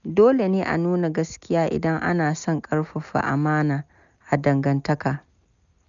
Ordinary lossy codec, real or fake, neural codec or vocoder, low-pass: none; real; none; 7.2 kHz